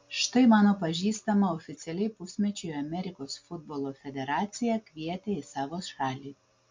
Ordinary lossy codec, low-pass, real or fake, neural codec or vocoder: MP3, 48 kbps; 7.2 kHz; real; none